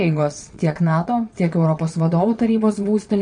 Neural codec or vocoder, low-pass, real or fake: vocoder, 22.05 kHz, 80 mel bands, Vocos; 9.9 kHz; fake